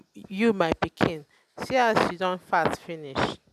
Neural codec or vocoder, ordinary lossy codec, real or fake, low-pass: none; none; real; 14.4 kHz